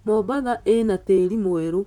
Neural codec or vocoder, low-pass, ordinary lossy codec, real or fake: vocoder, 44.1 kHz, 128 mel bands, Pupu-Vocoder; 19.8 kHz; none; fake